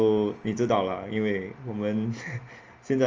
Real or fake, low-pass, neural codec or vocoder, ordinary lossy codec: real; 7.2 kHz; none; Opus, 24 kbps